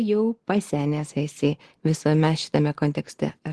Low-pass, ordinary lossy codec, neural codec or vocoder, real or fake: 10.8 kHz; Opus, 16 kbps; none; real